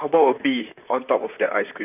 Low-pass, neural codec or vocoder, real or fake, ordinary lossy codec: 3.6 kHz; vocoder, 44.1 kHz, 128 mel bands, Pupu-Vocoder; fake; none